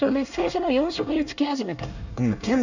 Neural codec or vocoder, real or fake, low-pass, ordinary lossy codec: codec, 24 kHz, 1 kbps, SNAC; fake; 7.2 kHz; none